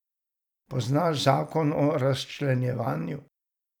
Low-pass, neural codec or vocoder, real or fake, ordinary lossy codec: 19.8 kHz; vocoder, 44.1 kHz, 128 mel bands every 256 samples, BigVGAN v2; fake; none